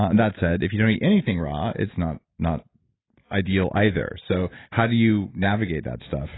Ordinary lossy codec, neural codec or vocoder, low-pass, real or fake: AAC, 16 kbps; none; 7.2 kHz; real